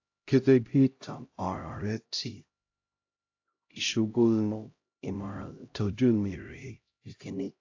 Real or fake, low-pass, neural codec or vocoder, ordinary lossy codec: fake; 7.2 kHz; codec, 16 kHz, 0.5 kbps, X-Codec, HuBERT features, trained on LibriSpeech; AAC, 48 kbps